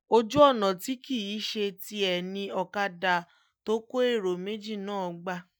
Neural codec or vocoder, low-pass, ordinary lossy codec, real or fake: none; none; none; real